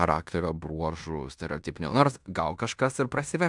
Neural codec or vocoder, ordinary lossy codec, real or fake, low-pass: codec, 16 kHz in and 24 kHz out, 0.9 kbps, LongCat-Audio-Codec, fine tuned four codebook decoder; MP3, 96 kbps; fake; 10.8 kHz